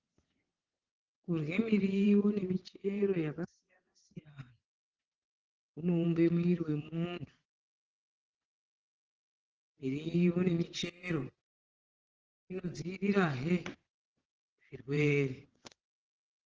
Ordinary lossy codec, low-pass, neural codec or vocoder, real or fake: Opus, 16 kbps; 7.2 kHz; vocoder, 22.05 kHz, 80 mel bands, WaveNeXt; fake